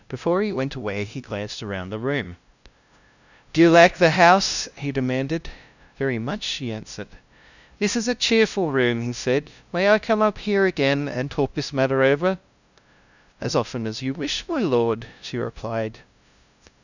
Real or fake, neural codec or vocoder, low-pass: fake; codec, 16 kHz, 0.5 kbps, FunCodec, trained on LibriTTS, 25 frames a second; 7.2 kHz